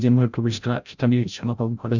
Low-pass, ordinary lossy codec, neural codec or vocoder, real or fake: 7.2 kHz; none; codec, 16 kHz, 0.5 kbps, FreqCodec, larger model; fake